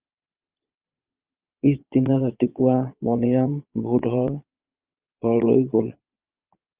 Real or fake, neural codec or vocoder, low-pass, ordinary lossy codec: fake; vocoder, 22.05 kHz, 80 mel bands, Vocos; 3.6 kHz; Opus, 32 kbps